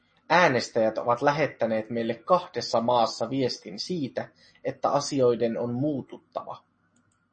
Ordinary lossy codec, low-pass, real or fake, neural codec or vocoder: MP3, 32 kbps; 9.9 kHz; real; none